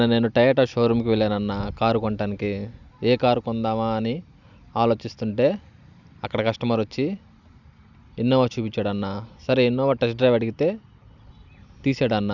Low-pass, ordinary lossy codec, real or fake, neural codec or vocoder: 7.2 kHz; none; real; none